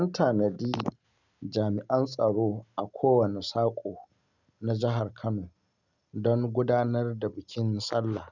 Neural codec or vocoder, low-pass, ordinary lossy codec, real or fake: none; 7.2 kHz; none; real